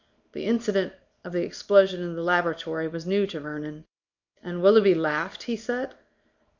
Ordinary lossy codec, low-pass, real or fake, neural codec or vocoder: MP3, 48 kbps; 7.2 kHz; fake; codec, 24 kHz, 0.9 kbps, WavTokenizer, small release